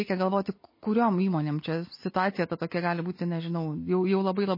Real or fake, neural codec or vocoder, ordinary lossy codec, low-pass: real; none; MP3, 24 kbps; 5.4 kHz